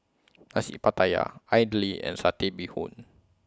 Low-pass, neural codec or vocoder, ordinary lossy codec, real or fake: none; none; none; real